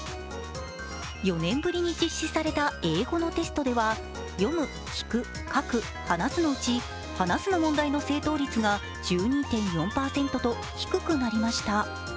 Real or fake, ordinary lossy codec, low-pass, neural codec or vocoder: real; none; none; none